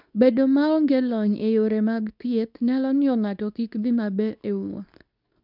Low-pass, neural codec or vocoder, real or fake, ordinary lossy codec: 5.4 kHz; codec, 24 kHz, 0.9 kbps, WavTokenizer, medium speech release version 2; fake; none